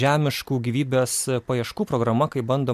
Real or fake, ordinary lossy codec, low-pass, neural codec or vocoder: real; MP3, 96 kbps; 14.4 kHz; none